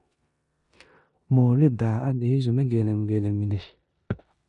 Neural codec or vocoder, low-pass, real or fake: codec, 16 kHz in and 24 kHz out, 0.9 kbps, LongCat-Audio-Codec, four codebook decoder; 10.8 kHz; fake